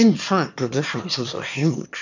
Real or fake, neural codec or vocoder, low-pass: fake; autoencoder, 22.05 kHz, a latent of 192 numbers a frame, VITS, trained on one speaker; 7.2 kHz